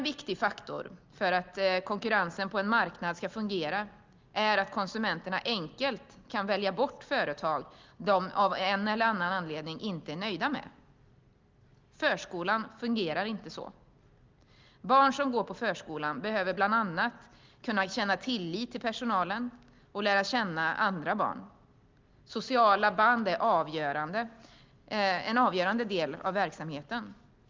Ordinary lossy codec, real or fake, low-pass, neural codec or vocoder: Opus, 32 kbps; real; 7.2 kHz; none